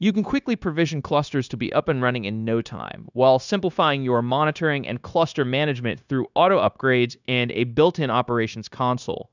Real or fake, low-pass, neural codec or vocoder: fake; 7.2 kHz; codec, 16 kHz, 0.9 kbps, LongCat-Audio-Codec